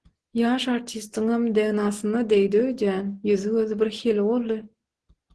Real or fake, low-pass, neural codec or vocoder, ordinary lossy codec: real; 10.8 kHz; none; Opus, 16 kbps